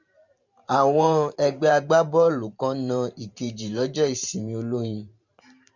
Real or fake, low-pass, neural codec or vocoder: fake; 7.2 kHz; vocoder, 44.1 kHz, 128 mel bands every 512 samples, BigVGAN v2